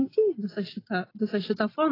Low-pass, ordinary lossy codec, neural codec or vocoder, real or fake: 5.4 kHz; AAC, 24 kbps; none; real